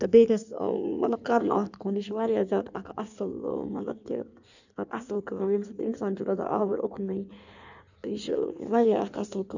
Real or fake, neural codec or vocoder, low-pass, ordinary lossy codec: fake; codec, 16 kHz in and 24 kHz out, 1.1 kbps, FireRedTTS-2 codec; 7.2 kHz; none